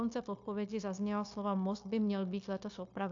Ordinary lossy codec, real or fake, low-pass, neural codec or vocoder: AAC, 48 kbps; fake; 7.2 kHz; codec, 16 kHz, 0.9 kbps, LongCat-Audio-Codec